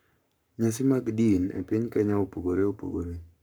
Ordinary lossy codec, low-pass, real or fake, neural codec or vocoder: none; none; fake; codec, 44.1 kHz, 7.8 kbps, Pupu-Codec